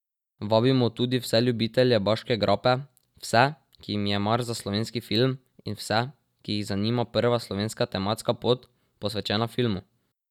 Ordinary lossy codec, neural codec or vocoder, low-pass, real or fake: none; none; 19.8 kHz; real